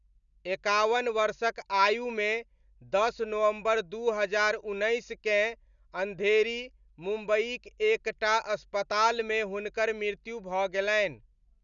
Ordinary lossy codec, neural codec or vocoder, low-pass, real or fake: none; none; 7.2 kHz; real